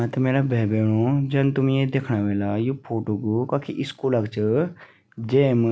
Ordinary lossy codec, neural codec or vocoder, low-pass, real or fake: none; none; none; real